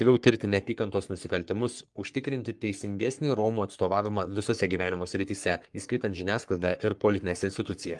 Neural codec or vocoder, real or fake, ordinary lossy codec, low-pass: codec, 44.1 kHz, 3.4 kbps, Pupu-Codec; fake; Opus, 32 kbps; 10.8 kHz